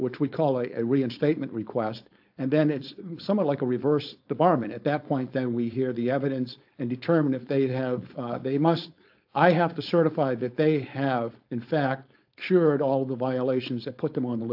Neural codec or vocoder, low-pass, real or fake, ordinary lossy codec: codec, 16 kHz, 4.8 kbps, FACodec; 5.4 kHz; fake; AAC, 48 kbps